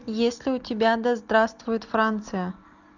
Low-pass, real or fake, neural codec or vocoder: 7.2 kHz; real; none